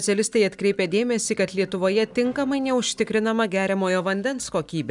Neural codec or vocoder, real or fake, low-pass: none; real; 10.8 kHz